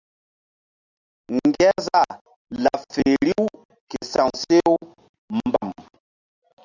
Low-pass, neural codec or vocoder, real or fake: 7.2 kHz; none; real